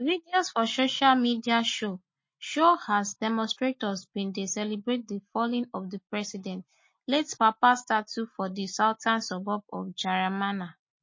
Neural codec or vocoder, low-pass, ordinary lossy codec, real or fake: none; 7.2 kHz; MP3, 32 kbps; real